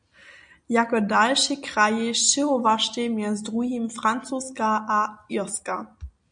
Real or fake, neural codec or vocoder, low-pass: real; none; 9.9 kHz